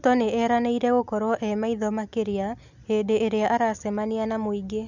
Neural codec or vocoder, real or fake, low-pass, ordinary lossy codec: none; real; 7.2 kHz; none